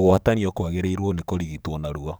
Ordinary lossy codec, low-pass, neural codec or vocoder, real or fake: none; none; codec, 44.1 kHz, 7.8 kbps, DAC; fake